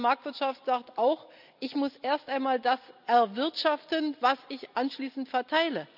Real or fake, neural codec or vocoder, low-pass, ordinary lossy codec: real; none; 5.4 kHz; none